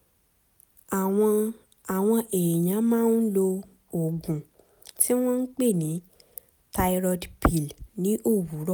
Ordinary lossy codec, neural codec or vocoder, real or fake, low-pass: none; none; real; none